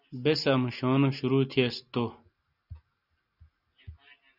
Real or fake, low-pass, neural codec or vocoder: real; 5.4 kHz; none